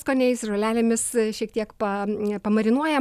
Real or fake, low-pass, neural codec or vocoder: real; 14.4 kHz; none